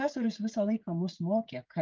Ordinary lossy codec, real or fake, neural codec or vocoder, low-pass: Opus, 32 kbps; fake; codec, 16 kHz, 16 kbps, FreqCodec, smaller model; 7.2 kHz